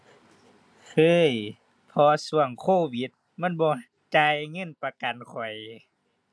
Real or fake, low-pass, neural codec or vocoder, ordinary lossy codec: real; none; none; none